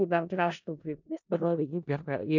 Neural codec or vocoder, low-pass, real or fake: codec, 16 kHz in and 24 kHz out, 0.4 kbps, LongCat-Audio-Codec, four codebook decoder; 7.2 kHz; fake